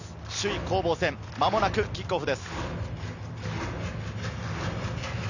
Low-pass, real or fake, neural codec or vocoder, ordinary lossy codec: 7.2 kHz; real; none; none